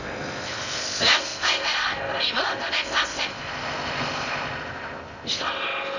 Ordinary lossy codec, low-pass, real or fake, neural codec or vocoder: none; 7.2 kHz; fake; codec, 16 kHz in and 24 kHz out, 0.6 kbps, FocalCodec, streaming, 2048 codes